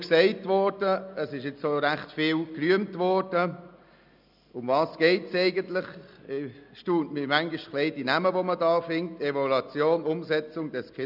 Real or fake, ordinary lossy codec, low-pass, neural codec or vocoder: real; none; 5.4 kHz; none